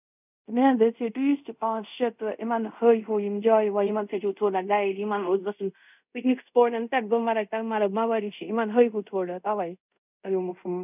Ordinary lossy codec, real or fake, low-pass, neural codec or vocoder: none; fake; 3.6 kHz; codec, 24 kHz, 0.5 kbps, DualCodec